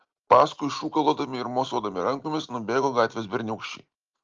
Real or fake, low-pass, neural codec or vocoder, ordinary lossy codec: real; 7.2 kHz; none; Opus, 32 kbps